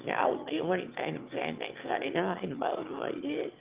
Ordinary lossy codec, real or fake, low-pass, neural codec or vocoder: Opus, 24 kbps; fake; 3.6 kHz; autoencoder, 22.05 kHz, a latent of 192 numbers a frame, VITS, trained on one speaker